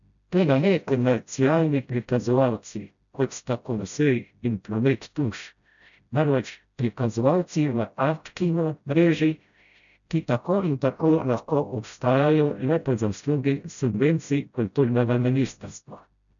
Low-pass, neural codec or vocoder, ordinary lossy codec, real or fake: 7.2 kHz; codec, 16 kHz, 0.5 kbps, FreqCodec, smaller model; none; fake